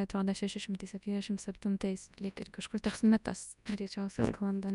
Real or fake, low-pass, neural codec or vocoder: fake; 10.8 kHz; codec, 24 kHz, 0.9 kbps, WavTokenizer, large speech release